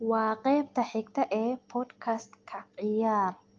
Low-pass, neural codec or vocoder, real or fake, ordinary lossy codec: 7.2 kHz; none; real; Opus, 24 kbps